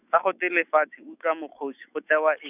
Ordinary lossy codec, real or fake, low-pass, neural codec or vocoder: none; fake; 3.6 kHz; autoencoder, 48 kHz, 128 numbers a frame, DAC-VAE, trained on Japanese speech